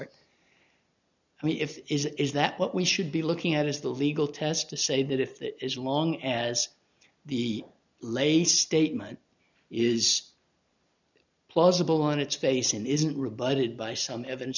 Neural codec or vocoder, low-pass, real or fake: none; 7.2 kHz; real